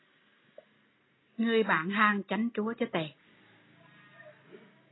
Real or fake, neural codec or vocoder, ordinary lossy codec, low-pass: real; none; AAC, 16 kbps; 7.2 kHz